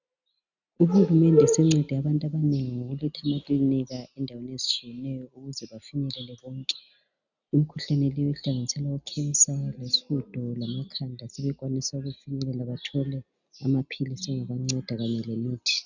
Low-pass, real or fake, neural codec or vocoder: 7.2 kHz; real; none